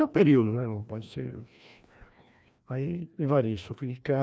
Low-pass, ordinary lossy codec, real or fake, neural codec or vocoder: none; none; fake; codec, 16 kHz, 1 kbps, FreqCodec, larger model